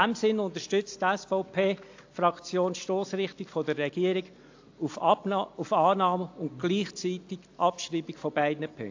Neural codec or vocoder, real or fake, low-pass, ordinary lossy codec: none; real; 7.2 kHz; AAC, 48 kbps